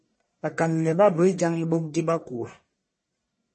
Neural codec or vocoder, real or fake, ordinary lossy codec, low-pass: codec, 44.1 kHz, 1.7 kbps, Pupu-Codec; fake; MP3, 32 kbps; 10.8 kHz